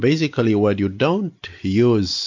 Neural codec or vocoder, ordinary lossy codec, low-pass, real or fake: none; MP3, 48 kbps; 7.2 kHz; real